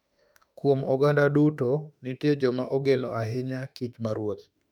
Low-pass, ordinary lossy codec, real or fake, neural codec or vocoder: 19.8 kHz; none; fake; autoencoder, 48 kHz, 32 numbers a frame, DAC-VAE, trained on Japanese speech